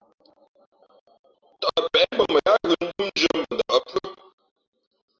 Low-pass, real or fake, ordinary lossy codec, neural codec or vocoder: 7.2 kHz; real; Opus, 24 kbps; none